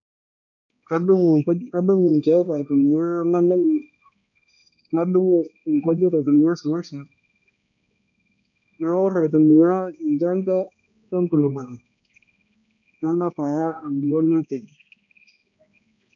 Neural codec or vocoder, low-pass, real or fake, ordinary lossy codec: codec, 16 kHz, 1 kbps, X-Codec, HuBERT features, trained on balanced general audio; 7.2 kHz; fake; AAC, 64 kbps